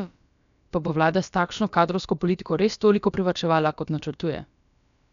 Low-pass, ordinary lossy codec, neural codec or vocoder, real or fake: 7.2 kHz; none; codec, 16 kHz, about 1 kbps, DyCAST, with the encoder's durations; fake